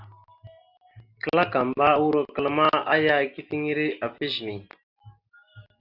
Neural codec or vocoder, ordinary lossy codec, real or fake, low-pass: none; Opus, 64 kbps; real; 5.4 kHz